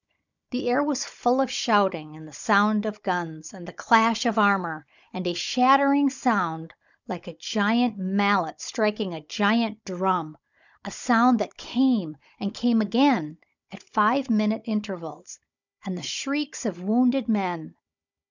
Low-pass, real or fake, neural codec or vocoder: 7.2 kHz; fake; codec, 16 kHz, 16 kbps, FunCodec, trained on Chinese and English, 50 frames a second